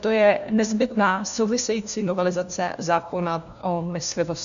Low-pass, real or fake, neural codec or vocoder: 7.2 kHz; fake; codec, 16 kHz, 1 kbps, FunCodec, trained on LibriTTS, 50 frames a second